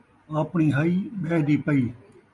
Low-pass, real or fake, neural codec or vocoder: 10.8 kHz; real; none